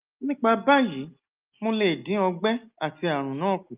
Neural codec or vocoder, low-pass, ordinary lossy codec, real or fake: none; 3.6 kHz; Opus, 32 kbps; real